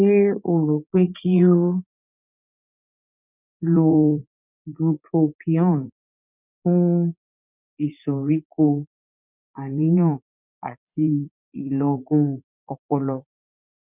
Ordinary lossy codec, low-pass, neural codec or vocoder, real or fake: none; 3.6 kHz; vocoder, 44.1 kHz, 80 mel bands, Vocos; fake